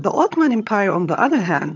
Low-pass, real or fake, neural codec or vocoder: 7.2 kHz; fake; vocoder, 22.05 kHz, 80 mel bands, HiFi-GAN